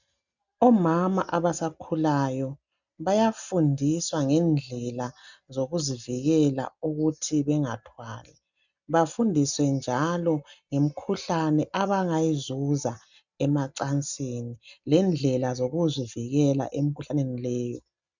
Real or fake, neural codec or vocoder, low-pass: real; none; 7.2 kHz